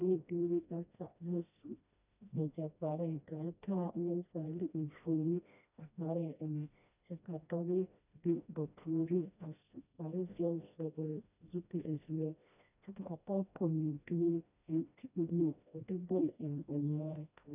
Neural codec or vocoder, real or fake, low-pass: codec, 16 kHz, 1 kbps, FreqCodec, smaller model; fake; 3.6 kHz